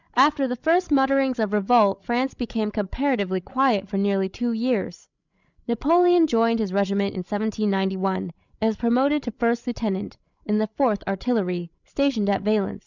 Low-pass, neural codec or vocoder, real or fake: 7.2 kHz; codec, 16 kHz, 16 kbps, FreqCodec, larger model; fake